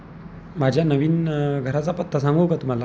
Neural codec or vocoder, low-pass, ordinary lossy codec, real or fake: none; none; none; real